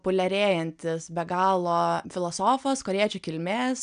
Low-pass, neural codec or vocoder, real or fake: 9.9 kHz; none; real